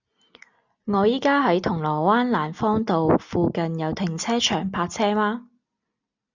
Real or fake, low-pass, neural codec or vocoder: real; 7.2 kHz; none